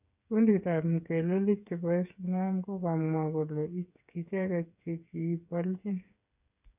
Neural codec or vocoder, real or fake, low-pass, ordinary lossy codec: codec, 16 kHz, 8 kbps, FreqCodec, smaller model; fake; 3.6 kHz; none